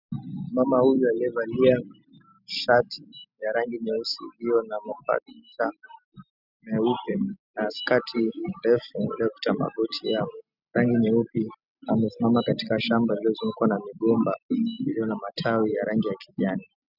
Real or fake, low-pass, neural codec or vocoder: real; 5.4 kHz; none